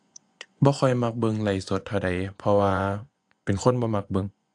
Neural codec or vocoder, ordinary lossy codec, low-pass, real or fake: vocoder, 24 kHz, 100 mel bands, Vocos; AAC, 64 kbps; 10.8 kHz; fake